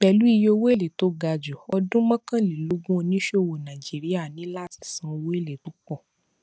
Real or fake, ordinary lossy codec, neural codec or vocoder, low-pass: real; none; none; none